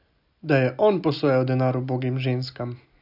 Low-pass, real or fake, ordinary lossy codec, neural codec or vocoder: 5.4 kHz; real; none; none